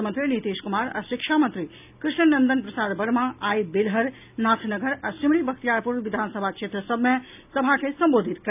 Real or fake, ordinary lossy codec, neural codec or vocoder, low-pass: real; none; none; 3.6 kHz